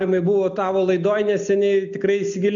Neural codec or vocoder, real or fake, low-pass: none; real; 7.2 kHz